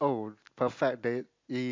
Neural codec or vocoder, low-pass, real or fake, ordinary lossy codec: none; 7.2 kHz; real; MP3, 48 kbps